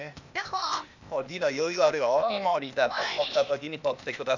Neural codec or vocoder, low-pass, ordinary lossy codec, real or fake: codec, 16 kHz, 0.8 kbps, ZipCodec; 7.2 kHz; none; fake